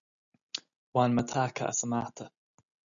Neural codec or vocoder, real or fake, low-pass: none; real; 7.2 kHz